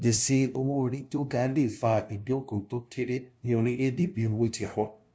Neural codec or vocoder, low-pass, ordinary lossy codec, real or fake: codec, 16 kHz, 0.5 kbps, FunCodec, trained on LibriTTS, 25 frames a second; none; none; fake